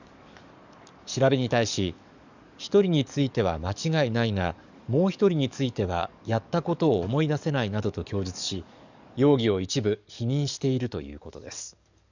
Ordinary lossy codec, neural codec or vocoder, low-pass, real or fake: none; codec, 44.1 kHz, 7.8 kbps, DAC; 7.2 kHz; fake